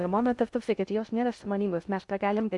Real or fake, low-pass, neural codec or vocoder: fake; 10.8 kHz; codec, 16 kHz in and 24 kHz out, 0.6 kbps, FocalCodec, streaming, 2048 codes